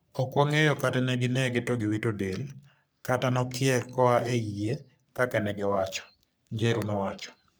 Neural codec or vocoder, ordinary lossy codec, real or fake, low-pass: codec, 44.1 kHz, 3.4 kbps, Pupu-Codec; none; fake; none